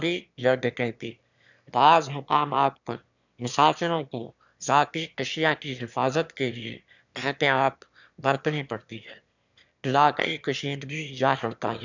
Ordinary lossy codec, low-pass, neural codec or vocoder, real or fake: none; 7.2 kHz; autoencoder, 22.05 kHz, a latent of 192 numbers a frame, VITS, trained on one speaker; fake